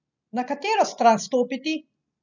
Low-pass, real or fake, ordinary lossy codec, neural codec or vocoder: 7.2 kHz; real; none; none